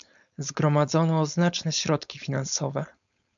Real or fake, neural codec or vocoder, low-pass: fake; codec, 16 kHz, 4.8 kbps, FACodec; 7.2 kHz